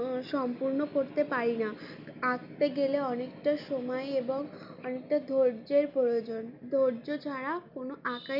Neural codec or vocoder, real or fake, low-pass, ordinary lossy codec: none; real; 5.4 kHz; none